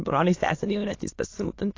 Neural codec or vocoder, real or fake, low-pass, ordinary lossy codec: autoencoder, 22.05 kHz, a latent of 192 numbers a frame, VITS, trained on many speakers; fake; 7.2 kHz; AAC, 32 kbps